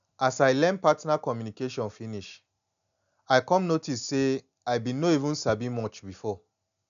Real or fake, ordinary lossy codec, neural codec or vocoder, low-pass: real; none; none; 7.2 kHz